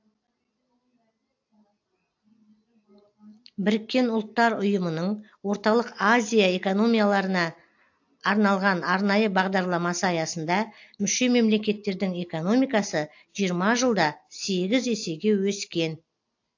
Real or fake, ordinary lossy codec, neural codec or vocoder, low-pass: real; AAC, 48 kbps; none; 7.2 kHz